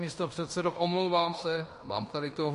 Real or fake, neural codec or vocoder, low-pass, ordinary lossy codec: fake; codec, 16 kHz in and 24 kHz out, 0.9 kbps, LongCat-Audio-Codec, fine tuned four codebook decoder; 10.8 kHz; MP3, 48 kbps